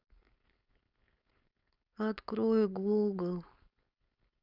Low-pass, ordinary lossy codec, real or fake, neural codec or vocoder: 5.4 kHz; Opus, 64 kbps; fake; codec, 16 kHz, 4.8 kbps, FACodec